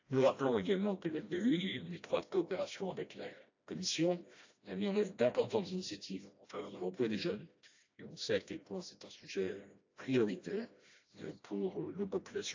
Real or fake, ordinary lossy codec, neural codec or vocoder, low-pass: fake; AAC, 48 kbps; codec, 16 kHz, 1 kbps, FreqCodec, smaller model; 7.2 kHz